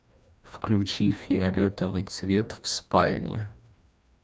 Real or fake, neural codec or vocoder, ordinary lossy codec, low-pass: fake; codec, 16 kHz, 1 kbps, FreqCodec, larger model; none; none